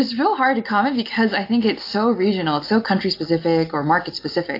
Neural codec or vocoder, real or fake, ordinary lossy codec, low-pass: none; real; AAC, 32 kbps; 5.4 kHz